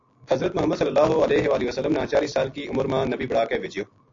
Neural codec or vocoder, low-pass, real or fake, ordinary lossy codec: none; 7.2 kHz; real; AAC, 48 kbps